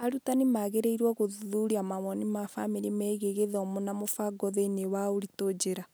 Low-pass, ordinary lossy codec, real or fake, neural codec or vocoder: none; none; real; none